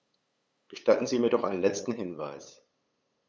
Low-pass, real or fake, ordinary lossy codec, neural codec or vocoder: none; fake; none; codec, 16 kHz, 8 kbps, FunCodec, trained on LibriTTS, 25 frames a second